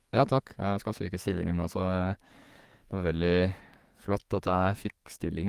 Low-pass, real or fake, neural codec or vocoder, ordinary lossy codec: 14.4 kHz; fake; codec, 44.1 kHz, 2.6 kbps, SNAC; Opus, 32 kbps